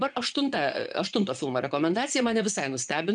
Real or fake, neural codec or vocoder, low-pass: fake; vocoder, 22.05 kHz, 80 mel bands, Vocos; 9.9 kHz